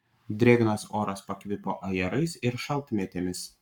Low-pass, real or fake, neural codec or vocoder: 19.8 kHz; fake; codec, 44.1 kHz, 7.8 kbps, Pupu-Codec